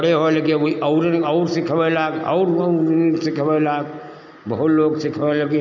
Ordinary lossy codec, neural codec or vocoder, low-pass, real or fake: none; none; 7.2 kHz; real